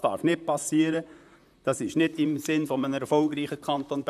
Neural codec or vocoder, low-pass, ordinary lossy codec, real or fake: vocoder, 44.1 kHz, 128 mel bands, Pupu-Vocoder; 14.4 kHz; none; fake